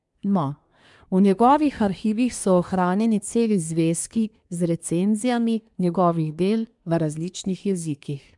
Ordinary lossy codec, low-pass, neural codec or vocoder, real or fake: MP3, 96 kbps; 10.8 kHz; codec, 24 kHz, 1 kbps, SNAC; fake